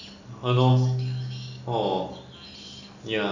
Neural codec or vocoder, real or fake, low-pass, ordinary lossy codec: none; real; 7.2 kHz; none